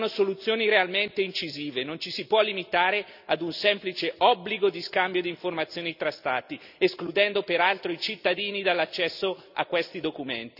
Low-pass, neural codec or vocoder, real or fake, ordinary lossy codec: 5.4 kHz; none; real; none